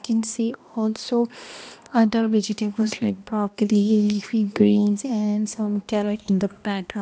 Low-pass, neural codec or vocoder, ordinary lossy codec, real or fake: none; codec, 16 kHz, 1 kbps, X-Codec, HuBERT features, trained on balanced general audio; none; fake